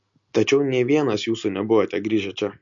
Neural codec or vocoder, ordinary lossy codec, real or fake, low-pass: none; MP3, 48 kbps; real; 7.2 kHz